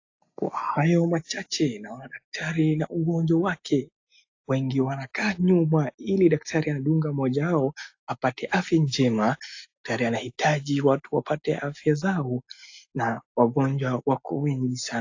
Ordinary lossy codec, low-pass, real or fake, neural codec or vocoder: AAC, 48 kbps; 7.2 kHz; real; none